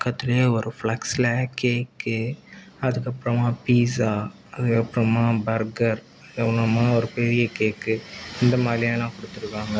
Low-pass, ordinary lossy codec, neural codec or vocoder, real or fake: none; none; none; real